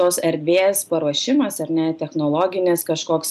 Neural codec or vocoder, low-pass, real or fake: none; 14.4 kHz; real